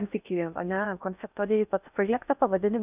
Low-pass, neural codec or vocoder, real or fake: 3.6 kHz; codec, 16 kHz in and 24 kHz out, 0.6 kbps, FocalCodec, streaming, 4096 codes; fake